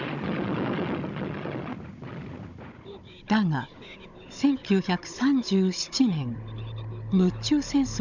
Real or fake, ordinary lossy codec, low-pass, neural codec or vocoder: fake; none; 7.2 kHz; codec, 16 kHz, 16 kbps, FunCodec, trained on Chinese and English, 50 frames a second